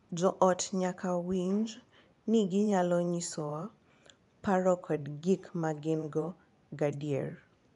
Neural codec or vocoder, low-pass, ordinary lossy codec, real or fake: vocoder, 24 kHz, 100 mel bands, Vocos; 10.8 kHz; none; fake